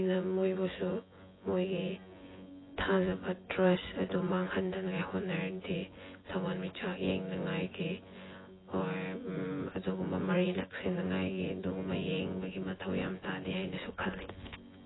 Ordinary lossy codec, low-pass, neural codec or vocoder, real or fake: AAC, 16 kbps; 7.2 kHz; vocoder, 24 kHz, 100 mel bands, Vocos; fake